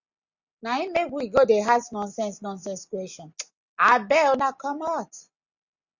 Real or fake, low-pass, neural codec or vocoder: real; 7.2 kHz; none